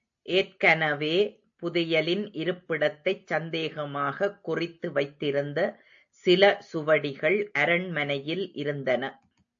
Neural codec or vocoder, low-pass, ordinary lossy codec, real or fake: none; 7.2 kHz; AAC, 64 kbps; real